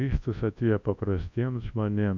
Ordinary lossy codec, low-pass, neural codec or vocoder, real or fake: AAC, 48 kbps; 7.2 kHz; codec, 24 kHz, 0.9 kbps, WavTokenizer, large speech release; fake